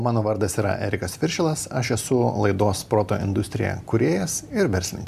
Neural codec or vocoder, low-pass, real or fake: none; 14.4 kHz; real